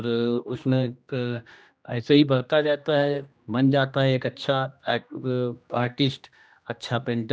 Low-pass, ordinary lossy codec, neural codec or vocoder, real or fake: none; none; codec, 16 kHz, 1 kbps, X-Codec, HuBERT features, trained on general audio; fake